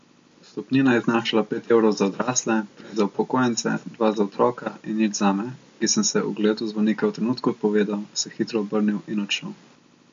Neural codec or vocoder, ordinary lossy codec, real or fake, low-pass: none; MP3, 48 kbps; real; 7.2 kHz